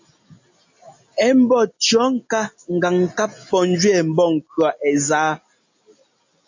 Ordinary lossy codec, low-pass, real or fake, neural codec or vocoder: AAC, 48 kbps; 7.2 kHz; real; none